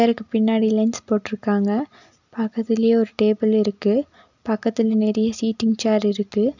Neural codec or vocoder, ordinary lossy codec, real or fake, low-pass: none; none; real; 7.2 kHz